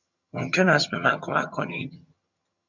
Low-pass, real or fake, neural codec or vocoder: 7.2 kHz; fake; vocoder, 22.05 kHz, 80 mel bands, HiFi-GAN